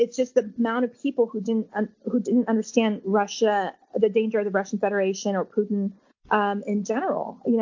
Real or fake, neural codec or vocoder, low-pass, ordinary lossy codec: real; none; 7.2 kHz; MP3, 48 kbps